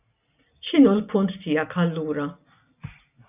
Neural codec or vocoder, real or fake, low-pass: none; real; 3.6 kHz